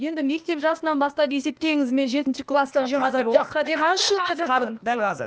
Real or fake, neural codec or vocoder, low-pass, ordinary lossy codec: fake; codec, 16 kHz, 0.8 kbps, ZipCodec; none; none